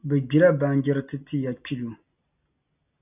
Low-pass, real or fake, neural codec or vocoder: 3.6 kHz; real; none